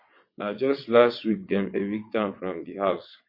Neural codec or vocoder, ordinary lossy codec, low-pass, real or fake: vocoder, 22.05 kHz, 80 mel bands, WaveNeXt; MP3, 32 kbps; 5.4 kHz; fake